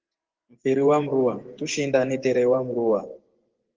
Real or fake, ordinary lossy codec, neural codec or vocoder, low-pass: fake; Opus, 16 kbps; vocoder, 24 kHz, 100 mel bands, Vocos; 7.2 kHz